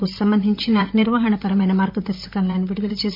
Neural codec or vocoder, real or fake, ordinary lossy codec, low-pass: vocoder, 44.1 kHz, 128 mel bands, Pupu-Vocoder; fake; MP3, 48 kbps; 5.4 kHz